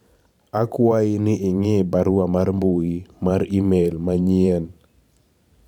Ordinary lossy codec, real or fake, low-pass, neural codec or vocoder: none; fake; 19.8 kHz; vocoder, 44.1 kHz, 128 mel bands every 512 samples, BigVGAN v2